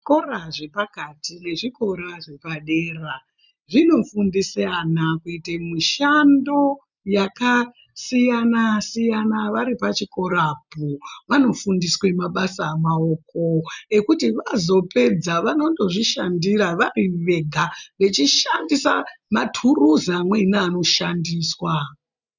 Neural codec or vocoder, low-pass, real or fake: none; 7.2 kHz; real